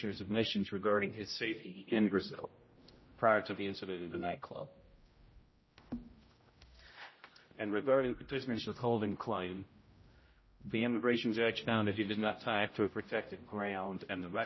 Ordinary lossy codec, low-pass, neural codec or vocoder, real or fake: MP3, 24 kbps; 7.2 kHz; codec, 16 kHz, 0.5 kbps, X-Codec, HuBERT features, trained on general audio; fake